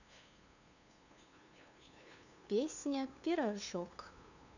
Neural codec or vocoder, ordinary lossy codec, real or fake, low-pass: codec, 16 kHz, 2 kbps, FunCodec, trained on LibriTTS, 25 frames a second; none; fake; 7.2 kHz